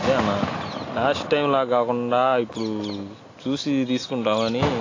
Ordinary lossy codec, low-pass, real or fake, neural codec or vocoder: AAC, 32 kbps; 7.2 kHz; real; none